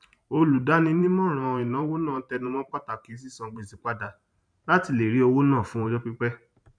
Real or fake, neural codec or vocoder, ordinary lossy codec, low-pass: fake; vocoder, 44.1 kHz, 128 mel bands every 512 samples, BigVGAN v2; none; 9.9 kHz